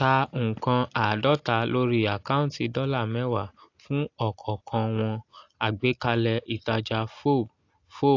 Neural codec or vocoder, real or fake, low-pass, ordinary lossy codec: none; real; 7.2 kHz; AAC, 48 kbps